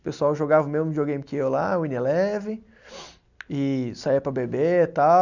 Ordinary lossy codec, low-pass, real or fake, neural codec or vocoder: none; 7.2 kHz; real; none